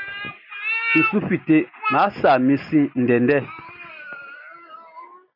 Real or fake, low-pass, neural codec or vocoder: real; 5.4 kHz; none